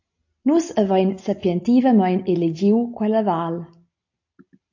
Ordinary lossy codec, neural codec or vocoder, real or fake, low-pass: AAC, 48 kbps; none; real; 7.2 kHz